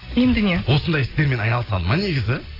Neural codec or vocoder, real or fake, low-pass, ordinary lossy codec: none; real; 5.4 kHz; AAC, 24 kbps